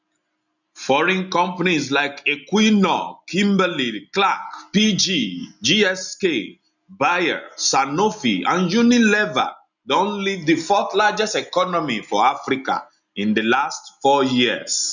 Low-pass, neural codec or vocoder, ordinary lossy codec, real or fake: 7.2 kHz; none; none; real